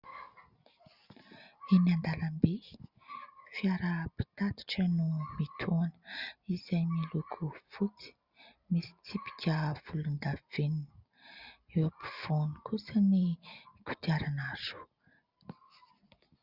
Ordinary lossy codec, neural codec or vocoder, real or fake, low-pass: Opus, 64 kbps; none; real; 5.4 kHz